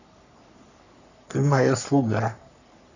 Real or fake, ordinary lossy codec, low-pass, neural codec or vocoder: fake; none; 7.2 kHz; codec, 44.1 kHz, 3.4 kbps, Pupu-Codec